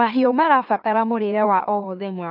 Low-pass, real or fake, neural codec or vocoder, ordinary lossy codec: 5.4 kHz; fake; autoencoder, 44.1 kHz, a latent of 192 numbers a frame, MeloTTS; none